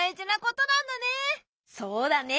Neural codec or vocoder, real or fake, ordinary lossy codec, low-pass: none; real; none; none